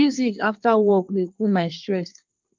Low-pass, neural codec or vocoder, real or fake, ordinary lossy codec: 7.2 kHz; codec, 24 kHz, 1 kbps, SNAC; fake; Opus, 24 kbps